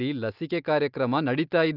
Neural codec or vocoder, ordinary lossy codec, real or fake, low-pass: none; Opus, 24 kbps; real; 5.4 kHz